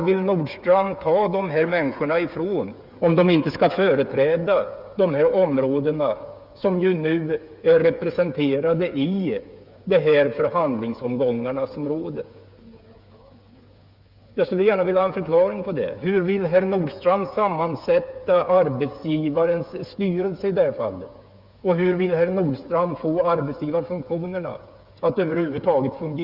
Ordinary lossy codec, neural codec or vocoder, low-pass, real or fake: Opus, 64 kbps; codec, 16 kHz, 8 kbps, FreqCodec, smaller model; 5.4 kHz; fake